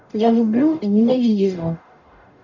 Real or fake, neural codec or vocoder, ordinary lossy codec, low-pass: fake; codec, 44.1 kHz, 0.9 kbps, DAC; none; 7.2 kHz